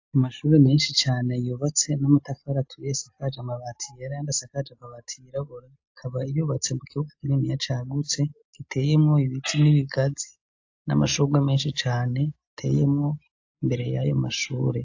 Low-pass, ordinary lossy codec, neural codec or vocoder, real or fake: 7.2 kHz; AAC, 48 kbps; none; real